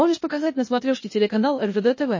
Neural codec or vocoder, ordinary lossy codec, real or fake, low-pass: codec, 16 kHz, 0.8 kbps, ZipCodec; MP3, 32 kbps; fake; 7.2 kHz